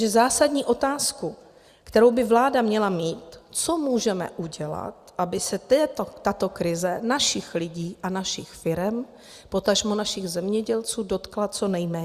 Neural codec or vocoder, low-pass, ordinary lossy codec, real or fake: none; 14.4 kHz; Opus, 64 kbps; real